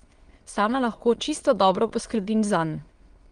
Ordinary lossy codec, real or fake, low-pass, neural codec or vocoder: Opus, 24 kbps; fake; 9.9 kHz; autoencoder, 22.05 kHz, a latent of 192 numbers a frame, VITS, trained on many speakers